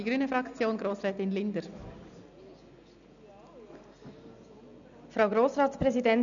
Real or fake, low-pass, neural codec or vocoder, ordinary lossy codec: real; 7.2 kHz; none; none